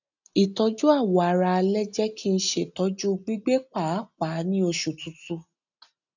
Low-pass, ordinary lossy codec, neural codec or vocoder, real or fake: 7.2 kHz; none; none; real